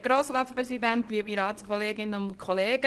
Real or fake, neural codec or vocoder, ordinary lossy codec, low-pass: fake; codec, 24 kHz, 0.9 kbps, WavTokenizer, small release; Opus, 16 kbps; 10.8 kHz